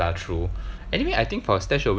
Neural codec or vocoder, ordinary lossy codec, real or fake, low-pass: none; none; real; none